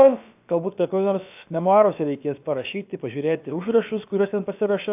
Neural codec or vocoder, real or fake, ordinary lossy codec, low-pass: codec, 16 kHz, about 1 kbps, DyCAST, with the encoder's durations; fake; AAC, 32 kbps; 3.6 kHz